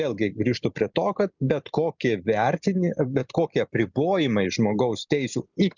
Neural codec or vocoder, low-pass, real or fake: none; 7.2 kHz; real